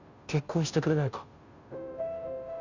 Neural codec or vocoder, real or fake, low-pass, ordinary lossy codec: codec, 16 kHz, 0.5 kbps, FunCodec, trained on Chinese and English, 25 frames a second; fake; 7.2 kHz; Opus, 64 kbps